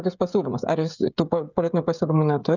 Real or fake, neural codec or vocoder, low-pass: fake; codec, 16 kHz, 16 kbps, FreqCodec, smaller model; 7.2 kHz